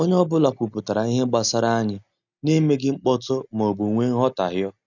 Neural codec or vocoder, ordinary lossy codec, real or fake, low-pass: none; none; real; 7.2 kHz